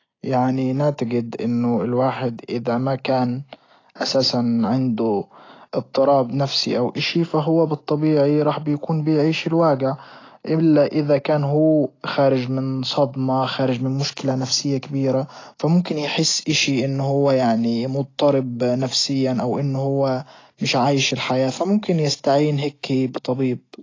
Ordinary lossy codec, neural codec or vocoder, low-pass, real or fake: AAC, 32 kbps; none; 7.2 kHz; real